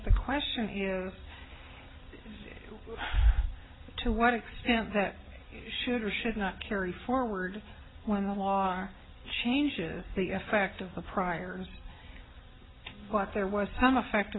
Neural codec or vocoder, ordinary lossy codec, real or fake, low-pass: none; AAC, 16 kbps; real; 7.2 kHz